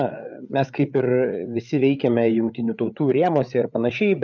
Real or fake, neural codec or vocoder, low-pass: fake; codec, 16 kHz, 8 kbps, FreqCodec, larger model; 7.2 kHz